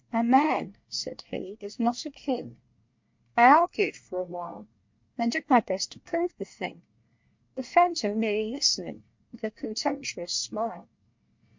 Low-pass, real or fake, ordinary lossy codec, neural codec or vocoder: 7.2 kHz; fake; MP3, 48 kbps; codec, 24 kHz, 1 kbps, SNAC